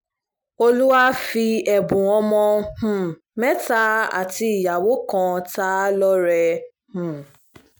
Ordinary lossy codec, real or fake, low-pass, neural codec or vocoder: none; real; none; none